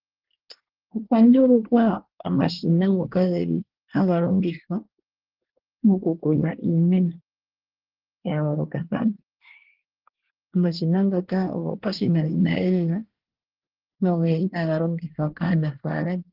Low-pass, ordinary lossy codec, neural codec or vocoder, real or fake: 5.4 kHz; Opus, 16 kbps; codec, 24 kHz, 1 kbps, SNAC; fake